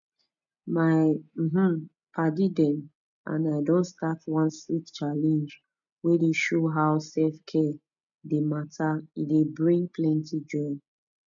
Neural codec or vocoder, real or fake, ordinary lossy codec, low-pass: none; real; none; 7.2 kHz